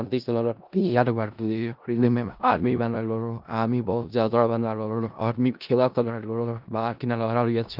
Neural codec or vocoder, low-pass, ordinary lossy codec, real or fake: codec, 16 kHz in and 24 kHz out, 0.4 kbps, LongCat-Audio-Codec, four codebook decoder; 5.4 kHz; Opus, 24 kbps; fake